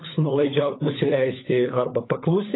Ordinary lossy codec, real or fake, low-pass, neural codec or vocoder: AAC, 16 kbps; fake; 7.2 kHz; codec, 16 kHz, 16 kbps, FunCodec, trained on LibriTTS, 50 frames a second